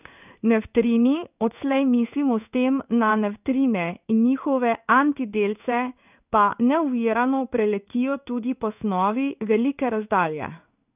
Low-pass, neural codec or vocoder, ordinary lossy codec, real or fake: 3.6 kHz; codec, 16 kHz in and 24 kHz out, 1 kbps, XY-Tokenizer; none; fake